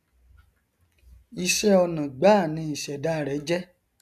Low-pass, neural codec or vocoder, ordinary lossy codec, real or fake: 14.4 kHz; none; none; real